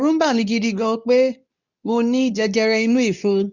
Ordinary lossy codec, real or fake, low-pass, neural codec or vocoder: none; fake; 7.2 kHz; codec, 24 kHz, 0.9 kbps, WavTokenizer, medium speech release version 2